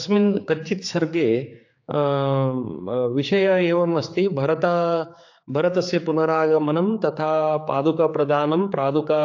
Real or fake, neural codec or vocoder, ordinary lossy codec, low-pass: fake; codec, 16 kHz, 4 kbps, X-Codec, HuBERT features, trained on general audio; AAC, 48 kbps; 7.2 kHz